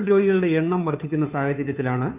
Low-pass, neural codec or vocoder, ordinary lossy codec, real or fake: 3.6 kHz; codec, 16 kHz, 2 kbps, FunCodec, trained on Chinese and English, 25 frames a second; none; fake